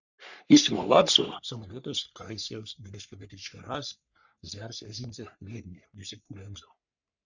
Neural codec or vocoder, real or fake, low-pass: codec, 44.1 kHz, 3.4 kbps, Pupu-Codec; fake; 7.2 kHz